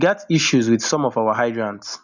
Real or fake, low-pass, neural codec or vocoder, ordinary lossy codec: real; 7.2 kHz; none; none